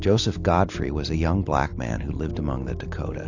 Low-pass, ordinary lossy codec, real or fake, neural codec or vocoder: 7.2 kHz; AAC, 48 kbps; real; none